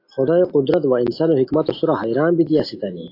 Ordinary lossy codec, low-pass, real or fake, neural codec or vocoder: AAC, 32 kbps; 5.4 kHz; real; none